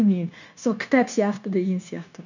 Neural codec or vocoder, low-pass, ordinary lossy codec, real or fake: codec, 16 kHz, 0.9 kbps, LongCat-Audio-Codec; 7.2 kHz; none; fake